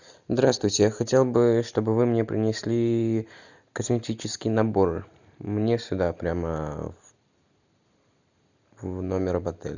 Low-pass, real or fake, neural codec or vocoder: 7.2 kHz; real; none